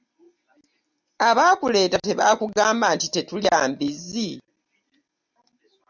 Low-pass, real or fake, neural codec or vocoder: 7.2 kHz; real; none